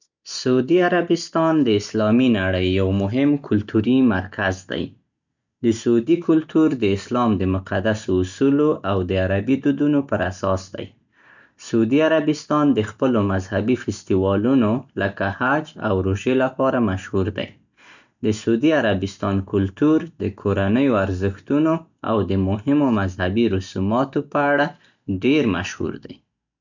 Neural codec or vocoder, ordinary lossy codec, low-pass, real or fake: none; none; 7.2 kHz; real